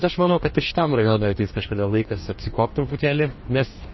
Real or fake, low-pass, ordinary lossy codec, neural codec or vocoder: fake; 7.2 kHz; MP3, 24 kbps; codec, 44.1 kHz, 2.6 kbps, DAC